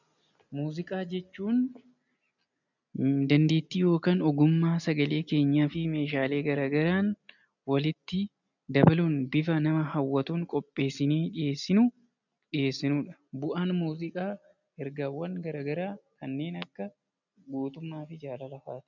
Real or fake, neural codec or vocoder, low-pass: real; none; 7.2 kHz